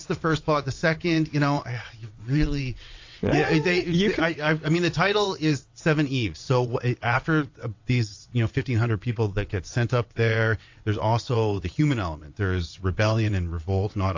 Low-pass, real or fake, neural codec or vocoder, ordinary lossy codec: 7.2 kHz; fake; vocoder, 22.05 kHz, 80 mel bands, WaveNeXt; AAC, 48 kbps